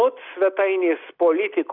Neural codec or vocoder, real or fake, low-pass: none; real; 5.4 kHz